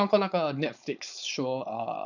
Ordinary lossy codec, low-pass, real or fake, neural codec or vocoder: none; 7.2 kHz; fake; codec, 16 kHz, 4.8 kbps, FACodec